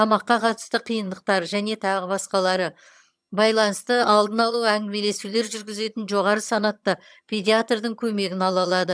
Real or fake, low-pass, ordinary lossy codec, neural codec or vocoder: fake; none; none; vocoder, 22.05 kHz, 80 mel bands, HiFi-GAN